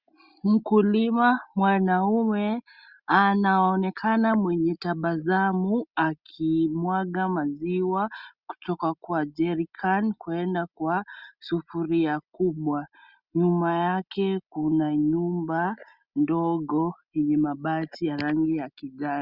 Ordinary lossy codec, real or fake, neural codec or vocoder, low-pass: Opus, 64 kbps; real; none; 5.4 kHz